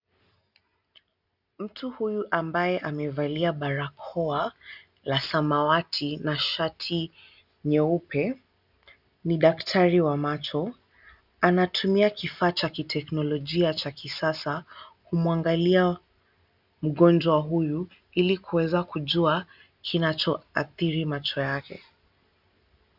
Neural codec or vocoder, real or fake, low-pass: none; real; 5.4 kHz